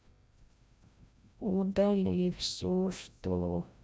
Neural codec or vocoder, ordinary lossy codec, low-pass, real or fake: codec, 16 kHz, 0.5 kbps, FreqCodec, larger model; none; none; fake